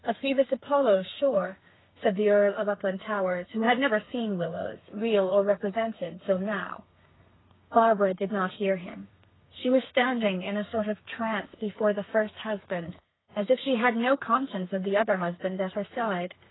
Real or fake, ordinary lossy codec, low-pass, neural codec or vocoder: fake; AAC, 16 kbps; 7.2 kHz; codec, 32 kHz, 1.9 kbps, SNAC